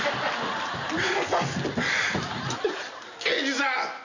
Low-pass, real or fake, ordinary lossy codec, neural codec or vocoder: 7.2 kHz; real; none; none